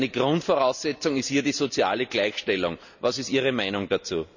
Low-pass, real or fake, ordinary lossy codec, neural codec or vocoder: 7.2 kHz; real; none; none